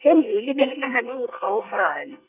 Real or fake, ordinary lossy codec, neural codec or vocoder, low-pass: fake; none; codec, 24 kHz, 1 kbps, SNAC; 3.6 kHz